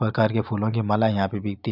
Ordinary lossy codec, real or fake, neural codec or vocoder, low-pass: none; real; none; 5.4 kHz